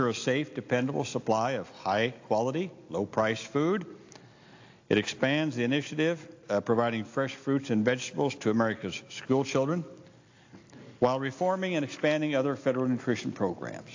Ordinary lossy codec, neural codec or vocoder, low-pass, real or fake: AAC, 48 kbps; none; 7.2 kHz; real